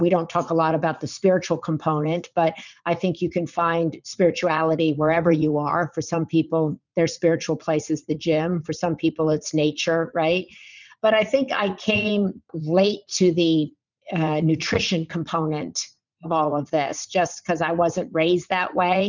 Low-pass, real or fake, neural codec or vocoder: 7.2 kHz; fake; vocoder, 44.1 kHz, 80 mel bands, Vocos